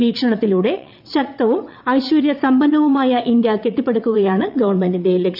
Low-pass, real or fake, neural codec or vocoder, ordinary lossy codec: 5.4 kHz; fake; vocoder, 22.05 kHz, 80 mel bands, WaveNeXt; none